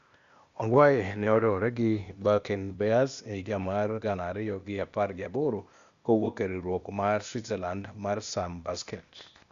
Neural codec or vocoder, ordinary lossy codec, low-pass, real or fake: codec, 16 kHz, 0.8 kbps, ZipCodec; Opus, 64 kbps; 7.2 kHz; fake